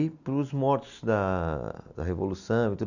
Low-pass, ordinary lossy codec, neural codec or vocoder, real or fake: 7.2 kHz; none; none; real